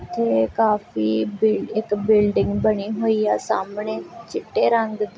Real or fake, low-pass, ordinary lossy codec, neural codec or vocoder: real; none; none; none